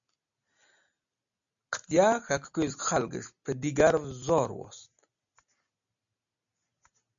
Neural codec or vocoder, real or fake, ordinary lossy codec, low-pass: none; real; MP3, 64 kbps; 7.2 kHz